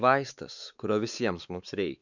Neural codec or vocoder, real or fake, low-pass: codec, 16 kHz, 4 kbps, X-Codec, WavLM features, trained on Multilingual LibriSpeech; fake; 7.2 kHz